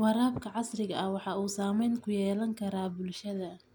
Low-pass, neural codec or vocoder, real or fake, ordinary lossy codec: none; none; real; none